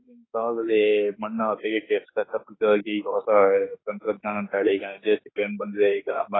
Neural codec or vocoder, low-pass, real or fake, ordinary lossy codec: autoencoder, 48 kHz, 32 numbers a frame, DAC-VAE, trained on Japanese speech; 7.2 kHz; fake; AAC, 16 kbps